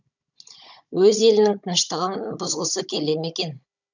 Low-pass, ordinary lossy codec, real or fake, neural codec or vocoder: 7.2 kHz; none; fake; codec, 16 kHz, 16 kbps, FunCodec, trained on Chinese and English, 50 frames a second